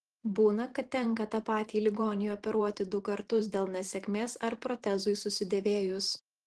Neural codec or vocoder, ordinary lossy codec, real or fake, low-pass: vocoder, 44.1 kHz, 128 mel bands every 512 samples, BigVGAN v2; Opus, 16 kbps; fake; 10.8 kHz